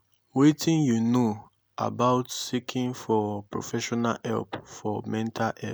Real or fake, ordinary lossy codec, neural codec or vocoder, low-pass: real; none; none; none